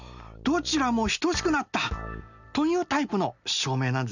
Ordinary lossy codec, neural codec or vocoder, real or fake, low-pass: none; none; real; 7.2 kHz